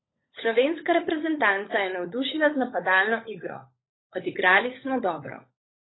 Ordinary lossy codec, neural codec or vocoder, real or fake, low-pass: AAC, 16 kbps; codec, 16 kHz, 16 kbps, FunCodec, trained on LibriTTS, 50 frames a second; fake; 7.2 kHz